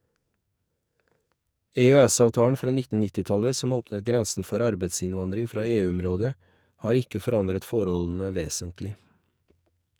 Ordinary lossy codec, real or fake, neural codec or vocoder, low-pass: none; fake; codec, 44.1 kHz, 2.6 kbps, SNAC; none